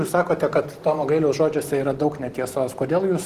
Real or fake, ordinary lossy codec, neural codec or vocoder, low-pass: real; Opus, 16 kbps; none; 14.4 kHz